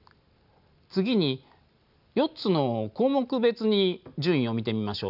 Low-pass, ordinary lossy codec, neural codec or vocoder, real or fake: 5.4 kHz; none; none; real